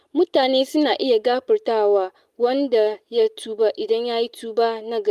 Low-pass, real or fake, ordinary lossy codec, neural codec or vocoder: 14.4 kHz; real; Opus, 32 kbps; none